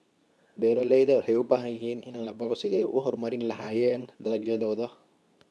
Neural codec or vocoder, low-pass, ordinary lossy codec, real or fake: codec, 24 kHz, 0.9 kbps, WavTokenizer, medium speech release version 2; none; none; fake